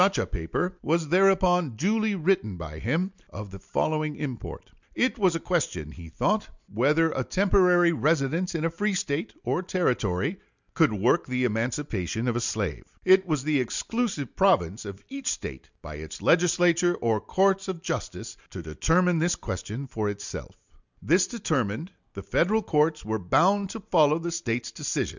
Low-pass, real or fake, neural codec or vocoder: 7.2 kHz; real; none